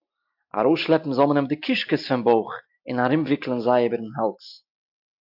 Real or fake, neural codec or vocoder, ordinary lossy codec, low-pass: real; none; AAC, 48 kbps; 5.4 kHz